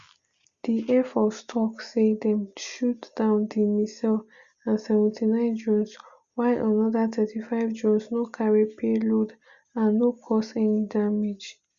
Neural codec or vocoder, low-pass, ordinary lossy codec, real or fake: none; 7.2 kHz; Opus, 64 kbps; real